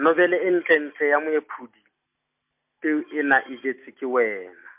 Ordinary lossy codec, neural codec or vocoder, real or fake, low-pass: MP3, 32 kbps; none; real; 3.6 kHz